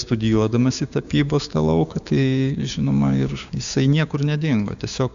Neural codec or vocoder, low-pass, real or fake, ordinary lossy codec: codec, 16 kHz, 6 kbps, DAC; 7.2 kHz; fake; AAC, 96 kbps